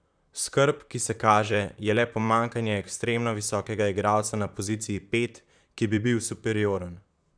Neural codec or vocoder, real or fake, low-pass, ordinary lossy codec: vocoder, 44.1 kHz, 128 mel bands, Pupu-Vocoder; fake; 9.9 kHz; none